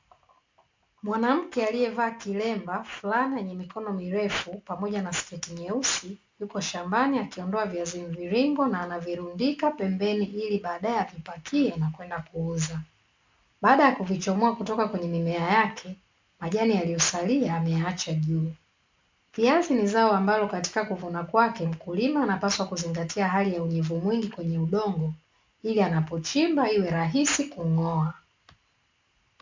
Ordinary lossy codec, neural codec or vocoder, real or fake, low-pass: MP3, 64 kbps; none; real; 7.2 kHz